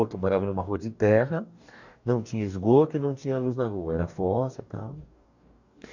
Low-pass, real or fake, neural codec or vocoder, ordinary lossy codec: 7.2 kHz; fake; codec, 44.1 kHz, 2.6 kbps, DAC; none